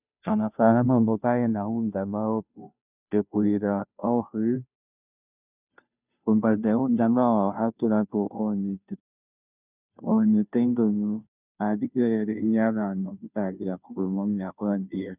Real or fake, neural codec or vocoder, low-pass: fake; codec, 16 kHz, 0.5 kbps, FunCodec, trained on Chinese and English, 25 frames a second; 3.6 kHz